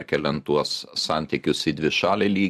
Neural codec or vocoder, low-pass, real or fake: none; 14.4 kHz; real